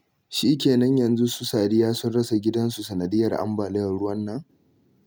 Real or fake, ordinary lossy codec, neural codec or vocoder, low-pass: real; none; none; none